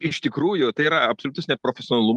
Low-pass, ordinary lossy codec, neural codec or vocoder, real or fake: 10.8 kHz; Opus, 32 kbps; none; real